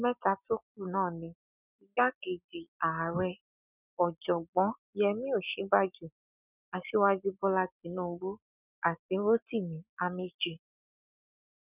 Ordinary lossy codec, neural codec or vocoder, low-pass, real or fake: none; none; 3.6 kHz; real